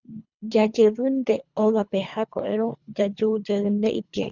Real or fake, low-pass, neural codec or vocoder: fake; 7.2 kHz; codec, 24 kHz, 3 kbps, HILCodec